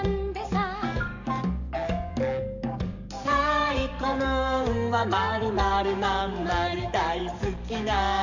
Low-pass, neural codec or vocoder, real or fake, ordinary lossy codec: 7.2 kHz; codec, 44.1 kHz, 7.8 kbps, DAC; fake; none